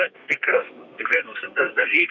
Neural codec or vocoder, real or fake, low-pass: codec, 32 kHz, 1.9 kbps, SNAC; fake; 7.2 kHz